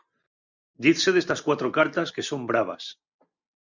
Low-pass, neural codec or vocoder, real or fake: 7.2 kHz; none; real